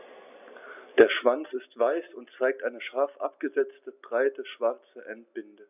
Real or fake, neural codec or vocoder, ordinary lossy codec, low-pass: real; none; none; 3.6 kHz